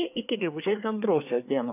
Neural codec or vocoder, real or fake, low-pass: codec, 24 kHz, 1 kbps, SNAC; fake; 3.6 kHz